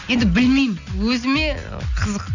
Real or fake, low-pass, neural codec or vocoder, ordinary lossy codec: real; 7.2 kHz; none; none